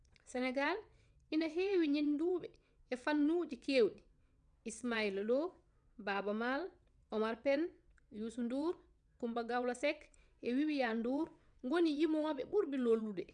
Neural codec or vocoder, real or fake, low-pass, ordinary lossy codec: vocoder, 22.05 kHz, 80 mel bands, WaveNeXt; fake; 9.9 kHz; none